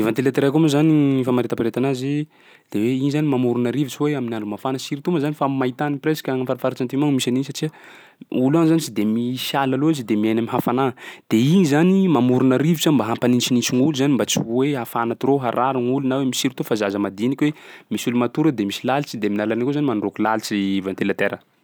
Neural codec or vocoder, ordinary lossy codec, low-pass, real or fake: none; none; none; real